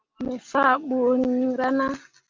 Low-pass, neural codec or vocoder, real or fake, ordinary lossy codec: 7.2 kHz; none; real; Opus, 32 kbps